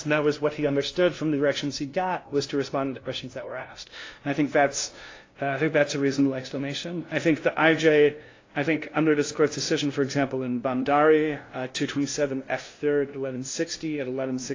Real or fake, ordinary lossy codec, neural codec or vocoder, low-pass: fake; AAC, 32 kbps; codec, 16 kHz, 0.5 kbps, FunCodec, trained on LibriTTS, 25 frames a second; 7.2 kHz